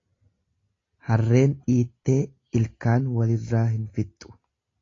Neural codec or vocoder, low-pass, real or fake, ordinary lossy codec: none; 7.2 kHz; real; AAC, 32 kbps